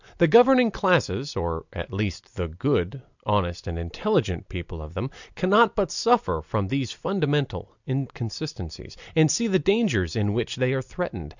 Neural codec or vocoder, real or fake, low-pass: none; real; 7.2 kHz